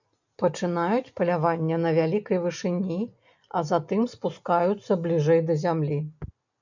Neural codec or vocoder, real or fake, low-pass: none; real; 7.2 kHz